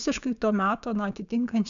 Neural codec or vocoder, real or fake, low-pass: codec, 16 kHz, 6 kbps, DAC; fake; 7.2 kHz